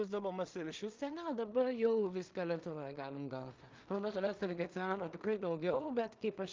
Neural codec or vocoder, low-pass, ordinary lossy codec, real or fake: codec, 16 kHz in and 24 kHz out, 0.4 kbps, LongCat-Audio-Codec, two codebook decoder; 7.2 kHz; Opus, 24 kbps; fake